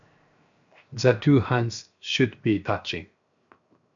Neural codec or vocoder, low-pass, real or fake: codec, 16 kHz, 0.7 kbps, FocalCodec; 7.2 kHz; fake